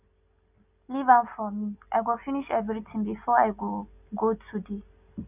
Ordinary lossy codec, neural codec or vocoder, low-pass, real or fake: none; none; 3.6 kHz; real